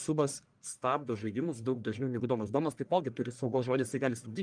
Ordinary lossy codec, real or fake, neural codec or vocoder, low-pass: Opus, 32 kbps; fake; codec, 44.1 kHz, 1.7 kbps, Pupu-Codec; 9.9 kHz